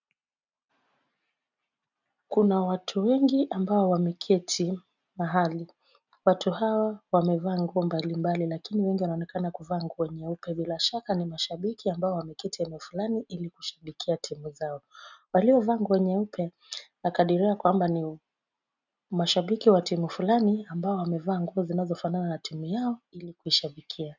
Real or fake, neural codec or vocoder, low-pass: real; none; 7.2 kHz